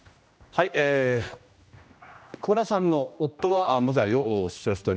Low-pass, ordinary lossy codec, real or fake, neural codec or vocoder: none; none; fake; codec, 16 kHz, 1 kbps, X-Codec, HuBERT features, trained on general audio